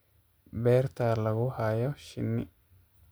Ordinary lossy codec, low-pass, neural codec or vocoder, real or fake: none; none; none; real